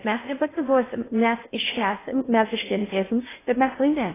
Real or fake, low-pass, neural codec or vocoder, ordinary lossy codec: fake; 3.6 kHz; codec, 16 kHz in and 24 kHz out, 0.6 kbps, FocalCodec, streaming, 2048 codes; AAC, 16 kbps